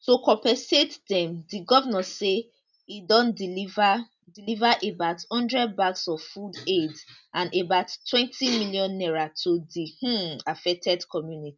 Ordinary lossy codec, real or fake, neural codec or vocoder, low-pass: none; real; none; 7.2 kHz